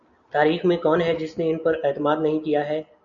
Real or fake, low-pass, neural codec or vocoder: real; 7.2 kHz; none